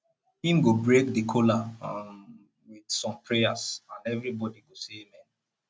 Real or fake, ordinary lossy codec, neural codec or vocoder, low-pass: real; none; none; none